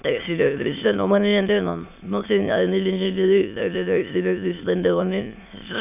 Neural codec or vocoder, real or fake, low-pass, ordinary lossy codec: autoencoder, 22.05 kHz, a latent of 192 numbers a frame, VITS, trained on many speakers; fake; 3.6 kHz; none